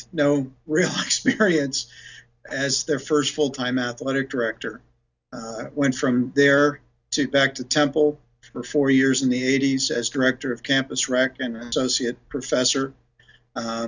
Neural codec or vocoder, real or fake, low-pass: none; real; 7.2 kHz